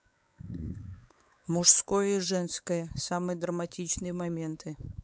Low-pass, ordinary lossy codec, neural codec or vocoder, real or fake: none; none; codec, 16 kHz, 4 kbps, X-Codec, WavLM features, trained on Multilingual LibriSpeech; fake